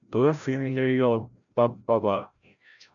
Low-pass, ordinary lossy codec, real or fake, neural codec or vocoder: 7.2 kHz; MP3, 96 kbps; fake; codec, 16 kHz, 0.5 kbps, FreqCodec, larger model